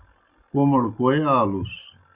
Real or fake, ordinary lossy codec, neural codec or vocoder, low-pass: real; Opus, 64 kbps; none; 3.6 kHz